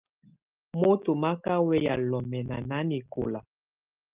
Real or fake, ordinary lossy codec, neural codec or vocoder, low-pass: real; Opus, 24 kbps; none; 3.6 kHz